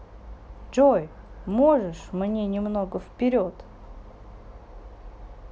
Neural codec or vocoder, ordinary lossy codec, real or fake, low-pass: none; none; real; none